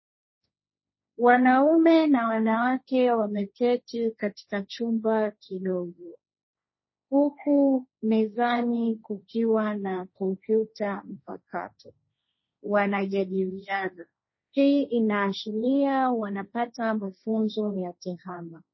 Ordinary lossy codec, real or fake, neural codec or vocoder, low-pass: MP3, 24 kbps; fake; codec, 16 kHz, 1.1 kbps, Voila-Tokenizer; 7.2 kHz